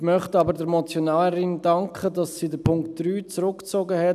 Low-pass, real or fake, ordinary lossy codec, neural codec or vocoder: 14.4 kHz; real; none; none